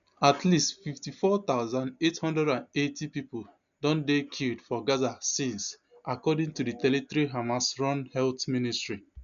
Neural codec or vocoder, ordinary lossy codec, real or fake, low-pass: none; none; real; 7.2 kHz